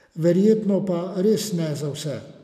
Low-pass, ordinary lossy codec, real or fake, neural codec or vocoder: 14.4 kHz; none; real; none